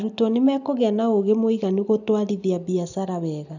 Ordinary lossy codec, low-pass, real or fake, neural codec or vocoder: none; 7.2 kHz; real; none